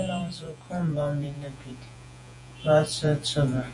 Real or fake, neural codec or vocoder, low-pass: fake; vocoder, 48 kHz, 128 mel bands, Vocos; 10.8 kHz